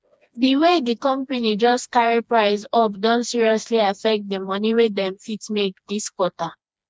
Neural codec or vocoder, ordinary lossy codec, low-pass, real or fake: codec, 16 kHz, 2 kbps, FreqCodec, smaller model; none; none; fake